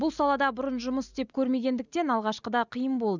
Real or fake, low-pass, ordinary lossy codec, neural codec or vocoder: real; 7.2 kHz; none; none